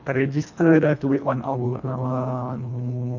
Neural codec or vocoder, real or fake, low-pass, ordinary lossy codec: codec, 24 kHz, 1.5 kbps, HILCodec; fake; 7.2 kHz; none